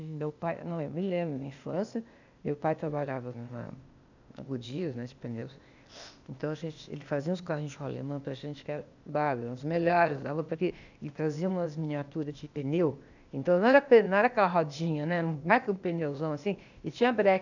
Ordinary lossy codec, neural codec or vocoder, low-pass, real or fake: none; codec, 16 kHz, 0.8 kbps, ZipCodec; 7.2 kHz; fake